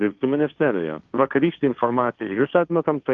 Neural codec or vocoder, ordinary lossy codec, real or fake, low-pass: codec, 16 kHz, 1.1 kbps, Voila-Tokenizer; Opus, 32 kbps; fake; 7.2 kHz